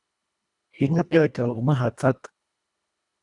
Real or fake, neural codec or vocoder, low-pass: fake; codec, 24 kHz, 1.5 kbps, HILCodec; 10.8 kHz